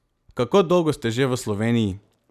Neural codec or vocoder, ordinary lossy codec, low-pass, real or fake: none; none; 14.4 kHz; real